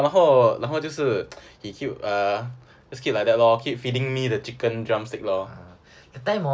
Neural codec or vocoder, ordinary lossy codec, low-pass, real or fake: none; none; none; real